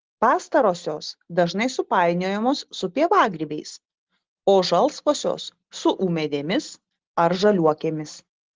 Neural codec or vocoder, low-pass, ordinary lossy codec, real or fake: none; 7.2 kHz; Opus, 16 kbps; real